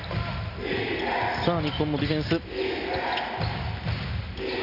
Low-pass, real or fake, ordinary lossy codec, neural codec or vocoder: 5.4 kHz; real; none; none